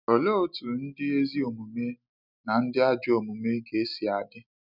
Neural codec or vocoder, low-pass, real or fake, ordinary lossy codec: none; 5.4 kHz; real; none